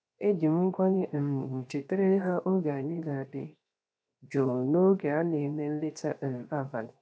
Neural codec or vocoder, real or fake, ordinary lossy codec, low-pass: codec, 16 kHz, 0.7 kbps, FocalCodec; fake; none; none